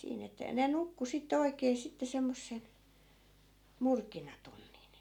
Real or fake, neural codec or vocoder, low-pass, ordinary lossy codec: real; none; 19.8 kHz; none